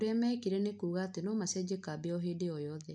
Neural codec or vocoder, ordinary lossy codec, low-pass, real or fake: none; none; 9.9 kHz; real